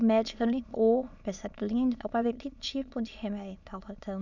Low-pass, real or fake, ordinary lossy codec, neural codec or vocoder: 7.2 kHz; fake; none; autoencoder, 22.05 kHz, a latent of 192 numbers a frame, VITS, trained on many speakers